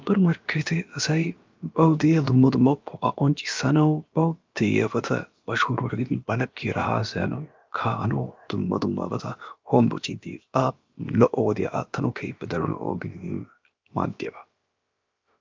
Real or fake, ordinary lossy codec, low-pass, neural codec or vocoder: fake; Opus, 24 kbps; 7.2 kHz; codec, 16 kHz, about 1 kbps, DyCAST, with the encoder's durations